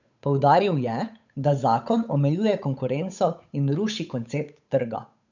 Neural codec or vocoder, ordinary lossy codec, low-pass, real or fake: codec, 16 kHz, 8 kbps, FunCodec, trained on Chinese and English, 25 frames a second; none; 7.2 kHz; fake